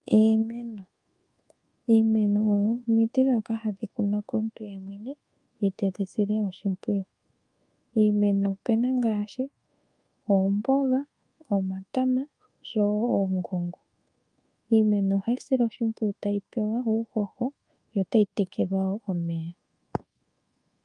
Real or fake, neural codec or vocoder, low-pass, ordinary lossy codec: fake; codec, 24 kHz, 1.2 kbps, DualCodec; 10.8 kHz; Opus, 32 kbps